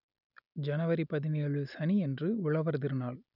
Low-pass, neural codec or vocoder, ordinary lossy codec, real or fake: 5.4 kHz; none; none; real